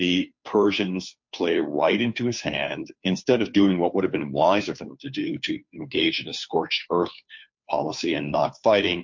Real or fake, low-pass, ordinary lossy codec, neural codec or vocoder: fake; 7.2 kHz; MP3, 48 kbps; codec, 16 kHz, 2 kbps, FunCodec, trained on Chinese and English, 25 frames a second